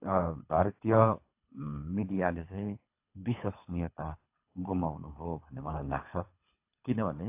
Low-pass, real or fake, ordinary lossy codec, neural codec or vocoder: 3.6 kHz; fake; AAC, 24 kbps; codec, 24 kHz, 3 kbps, HILCodec